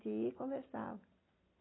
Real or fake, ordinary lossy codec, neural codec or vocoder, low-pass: real; AAC, 16 kbps; none; 7.2 kHz